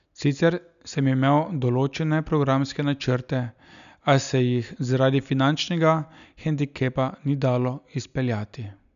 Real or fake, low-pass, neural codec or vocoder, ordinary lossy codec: real; 7.2 kHz; none; none